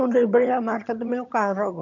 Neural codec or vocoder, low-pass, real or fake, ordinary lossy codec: vocoder, 22.05 kHz, 80 mel bands, HiFi-GAN; 7.2 kHz; fake; none